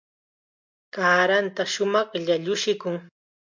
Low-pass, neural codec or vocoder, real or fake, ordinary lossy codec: 7.2 kHz; none; real; MP3, 64 kbps